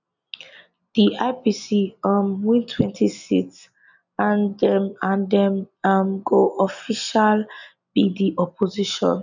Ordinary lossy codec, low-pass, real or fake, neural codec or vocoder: none; 7.2 kHz; real; none